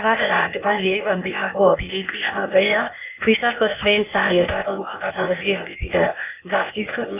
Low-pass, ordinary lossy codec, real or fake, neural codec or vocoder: 3.6 kHz; none; fake; codec, 16 kHz, 0.8 kbps, ZipCodec